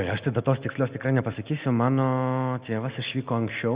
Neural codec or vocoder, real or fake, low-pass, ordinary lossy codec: none; real; 3.6 kHz; Opus, 64 kbps